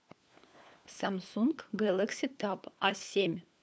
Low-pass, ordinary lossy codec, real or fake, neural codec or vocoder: none; none; fake; codec, 16 kHz, 4 kbps, FunCodec, trained on LibriTTS, 50 frames a second